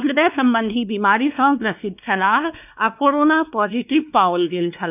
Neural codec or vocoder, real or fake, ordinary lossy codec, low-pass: codec, 16 kHz, 2 kbps, X-Codec, WavLM features, trained on Multilingual LibriSpeech; fake; none; 3.6 kHz